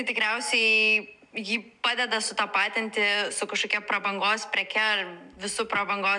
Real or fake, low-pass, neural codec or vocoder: real; 10.8 kHz; none